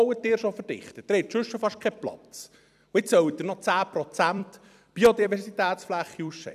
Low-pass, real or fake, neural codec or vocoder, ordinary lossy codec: 14.4 kHz; fake; vocoder, 44.1 kHz, 128 mel bands every 256 samples, BigVGAN v2; none